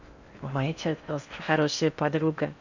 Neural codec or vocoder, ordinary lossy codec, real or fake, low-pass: codec, 16 kHz in and 24 kHz out, 0.6 kbps, FocalCodec, streaming, 4096 codes; none; fake; 7.2 kHz